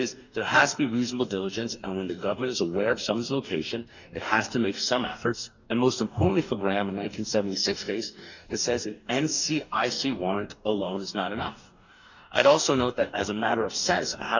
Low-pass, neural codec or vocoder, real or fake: 7.2 kHz; codec, 44.1 kHz, 2.6 kbps, DAC; fake